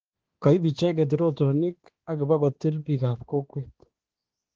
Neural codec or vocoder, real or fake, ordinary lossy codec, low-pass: codec, 16 kHz, 2 kbps, X-Codec, HuBERT features, trained on balanced general audio; fake; Opus, 16 kbps; 7.2 kHz